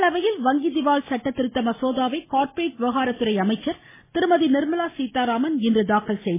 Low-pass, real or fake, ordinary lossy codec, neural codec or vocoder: 3.6 kHz; real; MP3, 16 kbps; none